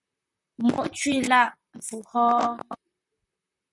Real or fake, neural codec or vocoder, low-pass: fake; vocoder, 44.1 kHz, 128 mel bands, Pupu-Vocoder; 10.8 kHz